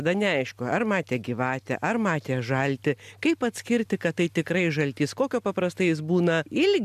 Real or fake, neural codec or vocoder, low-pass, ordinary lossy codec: real; none; 14.4 kHz; MP3, 96 kbps